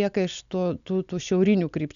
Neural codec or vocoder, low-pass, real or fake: none; 7.2 kHz; real